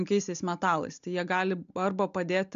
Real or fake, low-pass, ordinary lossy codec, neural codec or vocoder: real; 7.2 kHz; MP3, 64 kbps; none